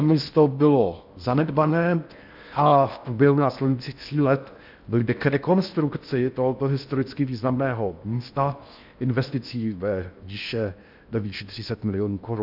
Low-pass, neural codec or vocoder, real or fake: 5.4 kHz; codec, 16 kHz in and 24 kHz out, 0.6 kbps, FocalCodec, streaming, 4096 codes; fake